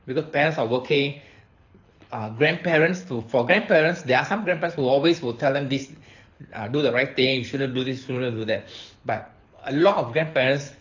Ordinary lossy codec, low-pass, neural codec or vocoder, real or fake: AAC, 48 kbps; 7.2 kHz; codec, 24 kHz, 6 kbps, HILCodec; fake